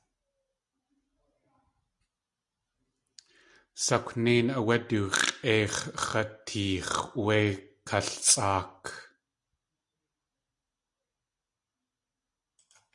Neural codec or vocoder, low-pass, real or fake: none; 10.8 kHz; real